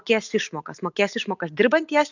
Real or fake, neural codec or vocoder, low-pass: real; none; 7.2 kHz